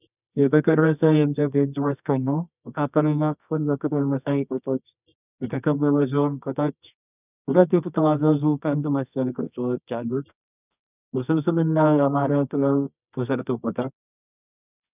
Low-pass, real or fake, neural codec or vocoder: 3.6 kHz; fake; codec, 24 kHz, 0.9 kbps, WavTokenizer, medium music audio release